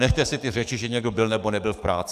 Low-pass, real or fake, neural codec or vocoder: 14.4 kHz; fake; codec, 44.1 kHz, 7.8 kbps, DAC